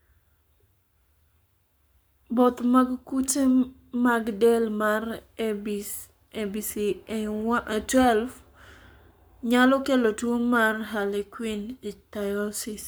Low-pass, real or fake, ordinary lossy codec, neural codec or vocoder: none; fake; none; codec, 44.1 kHz, 7.8 kbps, Pupu-Codec